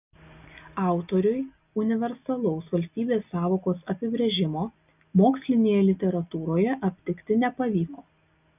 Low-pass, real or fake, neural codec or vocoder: 3.6 kHz; real; none